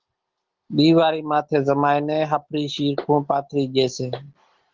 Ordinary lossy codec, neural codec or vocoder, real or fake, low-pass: Opus, 16 kbps; none; real; 7.2 kHz